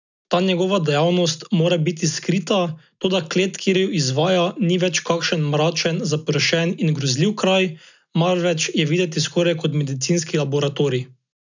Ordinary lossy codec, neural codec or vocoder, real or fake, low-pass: none; none; real; 7.2 kHz